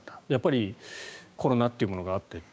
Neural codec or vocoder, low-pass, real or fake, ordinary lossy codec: codec, 16 kHz, 6 kbps, DAC; none; fake; none